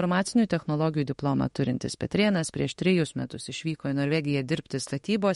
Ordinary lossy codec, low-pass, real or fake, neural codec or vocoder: MP3, 48 kbps; 19.8 kHz; fake; autoencoder, 48 kHz, 32 numbers a frame, DAC-VAE, trained on Japanese speech